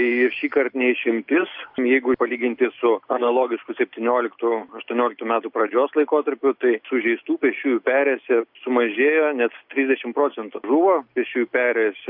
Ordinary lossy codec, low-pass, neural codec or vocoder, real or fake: AAC, 48 kbps; 5.4 kHz; none; real